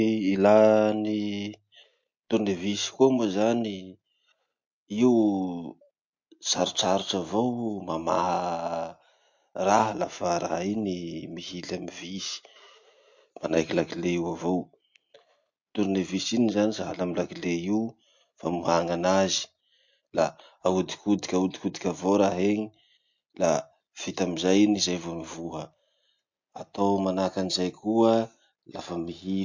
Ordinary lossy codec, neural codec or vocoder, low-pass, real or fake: MP3, 48 kbps; none; 7.2 kHz; real